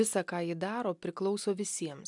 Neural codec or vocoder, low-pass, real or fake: none; 10.8 kHz; real